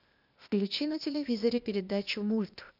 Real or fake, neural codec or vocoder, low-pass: fake; codec, 16 kHz, 0.8 kbps, ZipCodec; 5.4 kHz